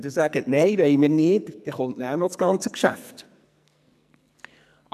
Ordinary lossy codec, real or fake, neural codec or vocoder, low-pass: none; fake; codec, 44.1 kHz, 2.6 kbps, SNAC; 14.4 kHz